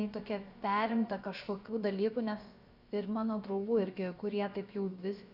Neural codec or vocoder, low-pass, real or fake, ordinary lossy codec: codec, 16 kHz, about 1 kbps, DyCAST, with the encoder's durations; 5.4 kHz; fake; Opus, 64 kbps